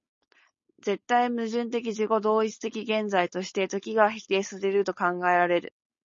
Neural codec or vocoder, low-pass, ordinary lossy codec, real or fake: codec, 16 kHz, 4.8 kbps, FACodec; 7.2 kHz; MP3, 32 kbps; fake